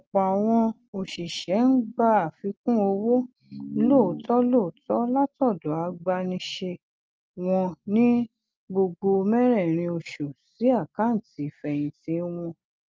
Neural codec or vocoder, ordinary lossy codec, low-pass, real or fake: none; Opus, 24 kbps; 7.2 kHz; real